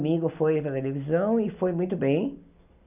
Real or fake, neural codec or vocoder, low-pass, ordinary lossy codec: real; none; 3.6 kHz; none